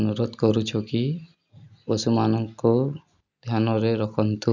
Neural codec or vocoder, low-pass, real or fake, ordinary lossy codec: none; 7.2 kHz; real; none